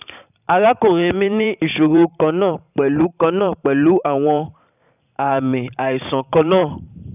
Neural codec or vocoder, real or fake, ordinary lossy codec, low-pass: vocoder, 44.1 kHz, 128 mel bands every 512 samples, BigVGAN v2; fake; none; 3.6 kHz